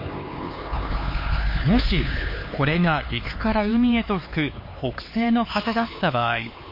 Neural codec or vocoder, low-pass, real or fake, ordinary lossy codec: codec, 16 kHz, 4 kbps, X-Codec, HuBERT features, trained on LibriSpeech; 5.4 kHz; fake; MP3, 32 kbps